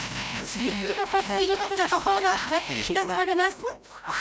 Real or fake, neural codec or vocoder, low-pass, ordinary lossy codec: fake; codec, 16 kHz, 0.5 kbps, FreqCodec, larger model; none; none